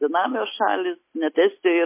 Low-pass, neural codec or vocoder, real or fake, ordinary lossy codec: 3.6 kHz; none; real; MP3, 24 kbps